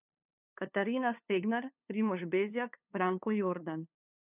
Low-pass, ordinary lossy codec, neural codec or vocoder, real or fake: 3.6 kHz; none; codec, 16 kHz, 2 kbps, FunCodec, trained on LibriTTS, 25 frames a second; fake